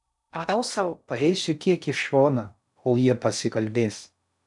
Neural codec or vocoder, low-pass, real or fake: codec, 16 kHz in and 24 kHz out, 0.6 kbps, FocalCodec, streaming, 2048 codes; 10.8 kHz; fake